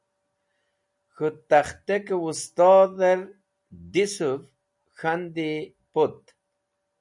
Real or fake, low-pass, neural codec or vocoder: real; 10.8 kHz; none